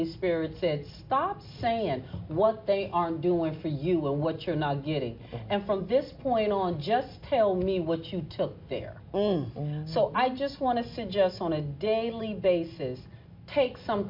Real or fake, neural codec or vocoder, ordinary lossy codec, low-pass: real; none; MP3, 48 kbps; 5.4 kHz